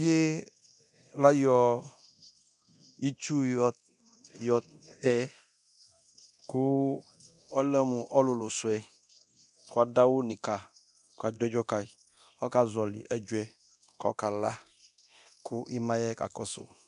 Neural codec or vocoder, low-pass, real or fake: codec, 24 kHz, 0.9 kbps, DualCodec; 10.8 kHz; fake